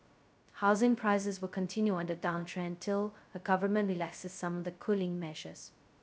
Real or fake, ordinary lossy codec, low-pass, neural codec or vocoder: fake; none; none; codec, 16 kHz, 0.2 kbps, FocalCodec